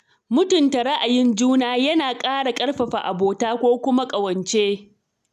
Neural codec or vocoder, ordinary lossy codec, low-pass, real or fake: none; none; 14.4 kHz; real